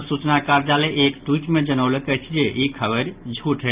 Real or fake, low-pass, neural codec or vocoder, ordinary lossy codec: real; 3.6 kHz; none; Opus, 32 kbps